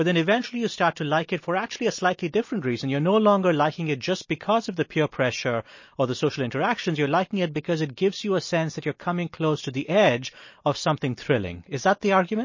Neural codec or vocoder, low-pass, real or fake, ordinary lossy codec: none; 7.2 kHz; real; MP3, 32 kbps